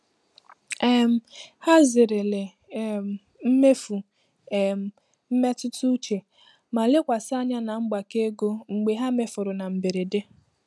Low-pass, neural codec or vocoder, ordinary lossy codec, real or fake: none; none; none; real